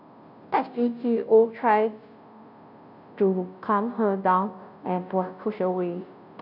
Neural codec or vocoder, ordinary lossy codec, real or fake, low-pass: codec, 16 kHz, 0.5 kbps, FunCodec, trained on Chinese and English, 25 frames a second; none; fake; 5.4 kHz